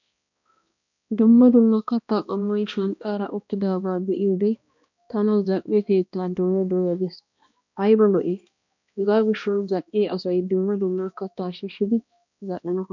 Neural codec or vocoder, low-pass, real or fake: codec, 16 kHz, 1 kbps, X-Codec, HuBERT features, trained on balanced general audio; 7.2 kHz; fake